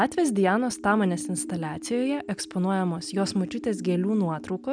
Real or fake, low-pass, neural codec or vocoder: real; 9.9 kHz; none